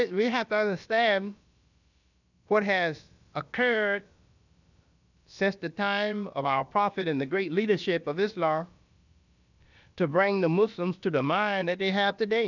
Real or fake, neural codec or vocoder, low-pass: fake; codec, 16 kHz, about 1 kbps, DyCAST, with the encoder's durations; 7.2 kHz